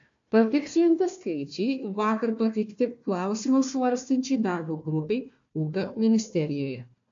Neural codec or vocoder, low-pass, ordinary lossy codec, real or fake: codec, 16 kHz, 1 kbps, FunCodec, trained on Chinese and English, 50 frames a second; 7.2 kHz; MP3, 48 kbps; fake